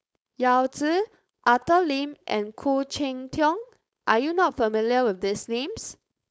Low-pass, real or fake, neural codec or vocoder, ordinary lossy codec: none; fake; codec, 16 kHz, 4.8 kbps, FACodec; none